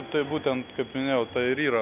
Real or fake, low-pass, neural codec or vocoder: real; 3.6 kHz; none